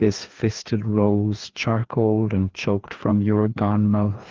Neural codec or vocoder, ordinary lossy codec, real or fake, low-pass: codec, 16 kHz, 2 kbps, FreqCodec, larger model; Opus, 16 kbps; fake; 7.2 kHz